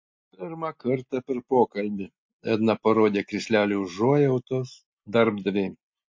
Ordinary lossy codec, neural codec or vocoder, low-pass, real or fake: MP3, 48 kbps; none; 7.2 kHz; real